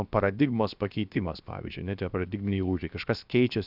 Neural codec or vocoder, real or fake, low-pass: codec, 16 kHz, 0.7 kbps, FocalCodec; fake; 5.4 kHz